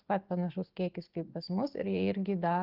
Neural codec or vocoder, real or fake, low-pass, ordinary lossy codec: none; real; 5.4 kHz; Opus, 16 kbps